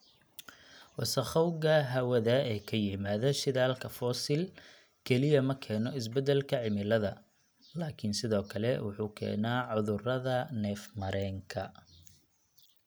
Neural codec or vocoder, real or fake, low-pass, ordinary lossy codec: none; real; none; none